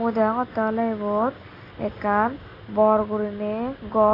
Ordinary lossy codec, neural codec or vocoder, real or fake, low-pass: AAC, 24 kbps; none; real; 5.4 kHz